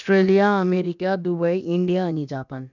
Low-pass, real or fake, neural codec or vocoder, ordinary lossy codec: 7.2 kHz; fake; codec, 16 kHz, about 1 kbps, DyCAST, with the encoder's durations; none